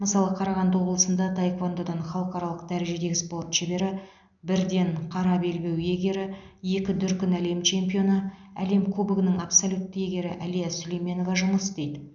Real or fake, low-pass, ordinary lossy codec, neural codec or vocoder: real; 7.2 kHz; none; none